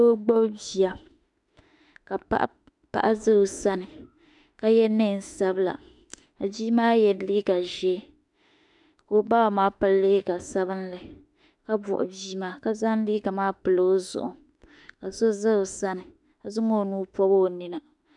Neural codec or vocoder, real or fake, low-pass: autoencoder, 48 kHz, 32 numbers a frame, DAC-VAE, trained on Japanese speech; fake; 10.8 kHz